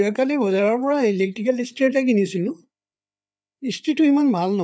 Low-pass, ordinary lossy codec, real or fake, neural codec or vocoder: none; none; fake; codec, 16 kHz, 4 kbps, FreqCodec, larger model